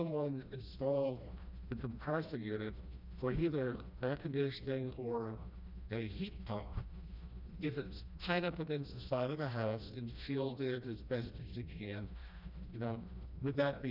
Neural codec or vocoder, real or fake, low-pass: codec, 16 kHz, 1 kbps, FreqCodec, smaller model; fake; 5.4 kHz